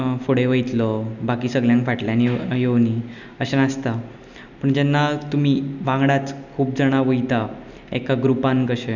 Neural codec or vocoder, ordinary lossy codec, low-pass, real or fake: none; none; 7.2 kHz; real